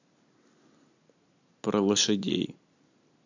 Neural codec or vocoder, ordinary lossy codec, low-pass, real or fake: none; AAC, 48 kbps; 7.2 kHz; real